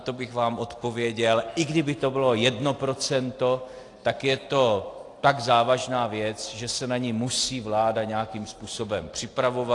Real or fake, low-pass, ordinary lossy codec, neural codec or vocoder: real; 10.8 kHz; AAC, 48 kbps; none